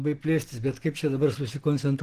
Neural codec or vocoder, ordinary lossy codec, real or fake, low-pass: none; Opus, 16 kbps; real; 14.4 kHz